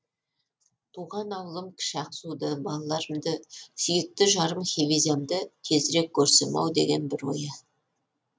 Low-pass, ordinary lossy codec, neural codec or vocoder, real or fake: none; none; none; real